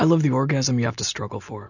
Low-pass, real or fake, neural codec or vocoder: 7.2 kHz; real; none